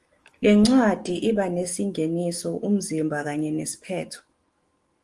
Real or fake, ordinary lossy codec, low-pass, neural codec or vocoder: fake; Opus, 24 kbps; 10.8 kHz; autoencoder, 48 kHz, 128 numbers a frame, DAC-VAE, trained on Japanese speech